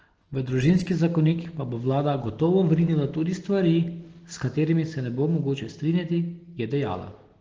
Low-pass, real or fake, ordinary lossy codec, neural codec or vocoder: 7.2 kHz; real; Opus, 16 kbps; none